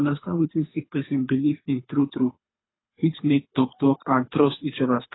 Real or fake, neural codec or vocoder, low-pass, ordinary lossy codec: fake; codec, 24 kHz, 3 kbps, HILCodec; 7.2 kHz; AAC, 16 kbps